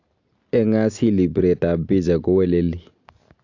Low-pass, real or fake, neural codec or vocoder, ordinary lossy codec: 7.2 kHz; real; none; AAC, 48 kbps